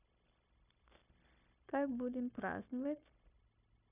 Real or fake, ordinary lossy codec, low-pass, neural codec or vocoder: fake; none; 3.6 kHz; codec, 16 kHz, 0.9 kbps, LongCat-Audio-Codec